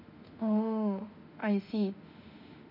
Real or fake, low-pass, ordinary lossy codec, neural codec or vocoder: fake; 5.4 kHz; none; autoencoder, 48 kHz, 32 numbers a frame, DAC-VAE, trained on Japanese speech